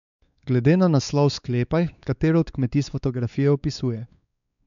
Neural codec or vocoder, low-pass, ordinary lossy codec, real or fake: codec, 16 kHz, 4 kbps, X-Codec, WavLM features, trained on Multilingual LibriSpeech; 7.2 kHz; none; fake